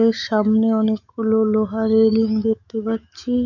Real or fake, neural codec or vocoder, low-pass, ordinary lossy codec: fake; autoencoder, 48 kHz, 128 numbers a frame, DAC-VAE, trained on Japanese speech; 7.2 kHz; none